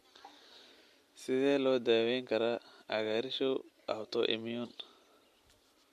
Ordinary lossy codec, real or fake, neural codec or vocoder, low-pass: MP3, 64 kbps; real; none; 14.4 kHz